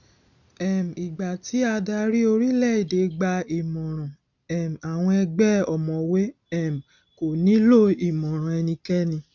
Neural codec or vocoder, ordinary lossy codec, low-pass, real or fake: none; none; 7.2 kHz; real